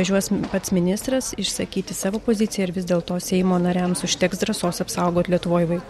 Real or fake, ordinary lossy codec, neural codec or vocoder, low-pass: real; MP3, 64 kbps; none; 19.8 kHz